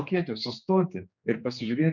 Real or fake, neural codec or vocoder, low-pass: fake; codec, 16 kHz, 2 kbps, X-Codec, HuBERT features, trained on general audio; 7.2 kHz